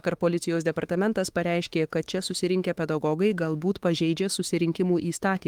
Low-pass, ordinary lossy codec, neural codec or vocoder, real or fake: 19.8 kHz; Opus, 24 kbps; autoencoder, 48 kHz, 32 numbers a frame, DAC-VAE, trained on Japanese speech; fake